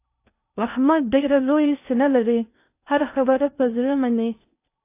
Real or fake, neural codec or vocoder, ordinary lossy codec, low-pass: fake; codec, 16 kHz in and 24 kHz out, 0.6 kbps, FocalCodec, streaming, 2048 codes; AAC, 24 kbps; 3.6 kHz